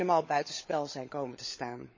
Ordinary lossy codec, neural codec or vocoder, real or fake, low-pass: MP3, 32 kbps; codec, 16 kHz, 16 kbps, FunCodec, trained on LibriTTS, 50 frames a second; fake; 7.2 kHz